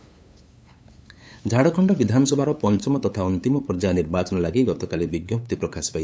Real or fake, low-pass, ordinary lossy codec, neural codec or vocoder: fake; none; none; codec, 16 kHz, 8 kbps, FunCodec, trained on LibriTTS, 25 frames a second